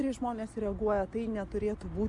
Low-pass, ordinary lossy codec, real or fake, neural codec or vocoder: 10.8 kHz; MP3, 64 kbps; real; none